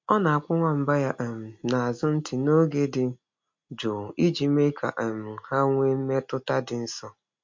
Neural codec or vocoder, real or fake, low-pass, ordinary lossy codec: none; real; 7.2 kHz; MP3, 48 kbps